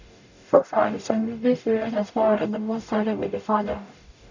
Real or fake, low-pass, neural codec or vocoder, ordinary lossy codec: fake; 7.2 kHz; codec, 44.1 kHz, 0.9 kbps, DAC; none